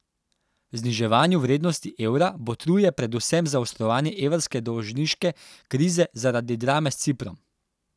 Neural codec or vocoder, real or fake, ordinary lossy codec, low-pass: none; real; none; none